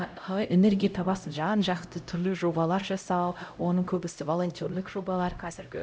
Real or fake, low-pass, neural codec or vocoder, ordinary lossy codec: fake; none; codec, 16 kHz, 0.5 kbps, X-Codec, HuBERT features, trained on LibriSpeech; none